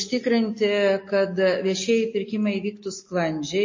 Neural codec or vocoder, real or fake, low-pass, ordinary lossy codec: none; real; 7.2 kHz; MP3, 32 kbps